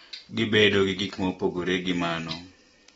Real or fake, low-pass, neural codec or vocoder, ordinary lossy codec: real; 19.8 kHz; none; AAC, 24 kbps